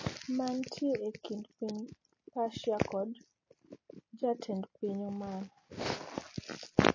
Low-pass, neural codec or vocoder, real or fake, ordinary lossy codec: 7.2 kHz; none; real; MP3, 32 kbps